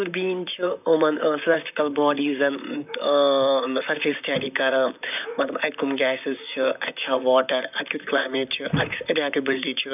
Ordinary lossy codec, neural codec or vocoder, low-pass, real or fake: none; vocoder, 44.1 kHz, 128 mel bands, Pupu-Vocoder; 3.6 kHz; fake